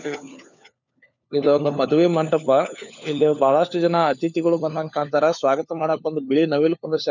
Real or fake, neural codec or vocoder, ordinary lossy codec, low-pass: fake; codec, 16 kHz, 4 kbps, FunCodec, trained on LibriTTS, 50 frames a second; none; 7.2 kHz